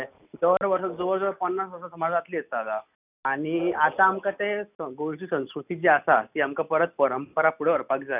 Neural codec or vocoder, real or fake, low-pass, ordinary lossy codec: none; real; 3.6 kHz; none